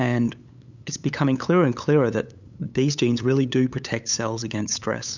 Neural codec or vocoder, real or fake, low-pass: codec, 16 kHz, 8 kbps, FunCodec, trained on LibriTTS, 25 frames a second; fake; 7.2 kHz